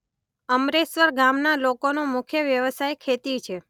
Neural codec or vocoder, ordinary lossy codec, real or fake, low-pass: none; none; real; 19.8 kHz